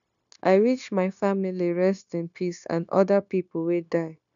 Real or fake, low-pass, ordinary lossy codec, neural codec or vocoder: fake; 7.2 kHz; none; codec, 16 kHz, 0.9 kbps, LongCat-Audio-Codec